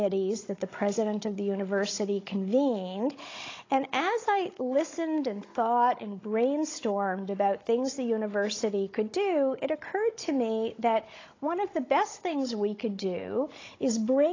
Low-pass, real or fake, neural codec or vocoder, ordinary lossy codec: 7.2 kHz; fake; codec, 16 kHz, 16 kbps, FunCodec, trained on Chinese and English, 50 frames a second; AAC, 32 kbps